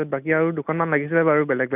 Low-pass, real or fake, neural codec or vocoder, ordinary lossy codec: 3.6 kHz; real; none; none